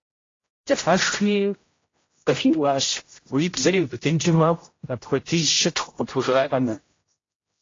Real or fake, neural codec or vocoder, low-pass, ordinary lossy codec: fake; codec, 16 kHz, 0.5 kbps, X-Codec, HuBERT features, trained on general audio; 7.2 kHz; AAC, 32 kbps